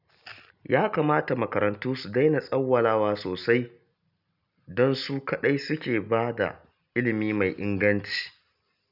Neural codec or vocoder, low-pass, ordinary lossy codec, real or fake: none; 5.4 kHz; none; real